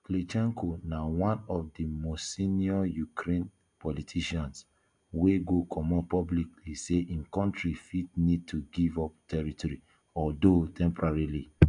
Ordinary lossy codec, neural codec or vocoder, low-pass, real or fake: MP3, 96 kbps; none; 9.9 kHz; real